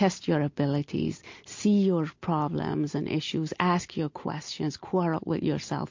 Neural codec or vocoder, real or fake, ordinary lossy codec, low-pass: none; real; MP3, 48 kbps; 7.2 kHz